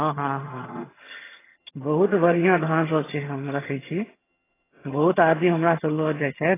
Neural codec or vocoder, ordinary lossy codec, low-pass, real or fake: vocoder, 22.05 kHz, 80 mel bands, HiFi-GAN; AAC, 16 kbps; 3.6 kHz; fake